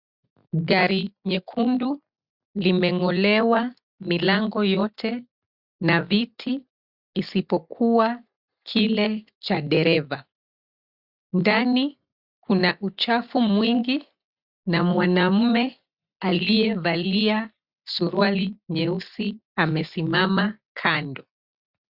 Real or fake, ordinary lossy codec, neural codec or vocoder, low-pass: real; Opus, 64 kbps; none; 5.4 kHz